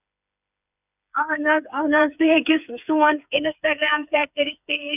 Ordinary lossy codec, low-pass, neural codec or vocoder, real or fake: none; 3.6 kHz; codec, 16 kHz, 4 kbps, FreqCodec, smaller model; fake